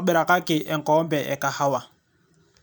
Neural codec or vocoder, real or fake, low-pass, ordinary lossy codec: vocoder, 44.1 kHz, 128 mel bands every 512 samples, BigVGAN v2; fake; none; none